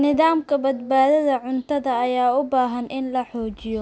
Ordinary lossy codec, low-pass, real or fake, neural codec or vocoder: none; none; real; none